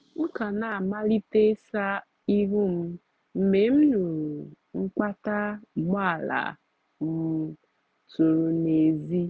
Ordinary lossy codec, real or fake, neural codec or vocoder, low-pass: none; real; none; none